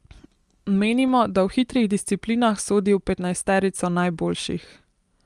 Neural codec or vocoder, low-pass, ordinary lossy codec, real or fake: none; 10.8 kHz; Opus, 32 kbps; real